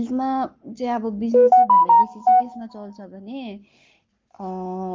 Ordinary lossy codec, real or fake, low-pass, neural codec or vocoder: Opus, 24 kbps; fake; 7.2 kHz; codec, 44.1 kHz, 7.8 kbps, Pupu-Codec